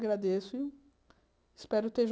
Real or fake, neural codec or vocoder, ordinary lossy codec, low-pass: real; none; none; none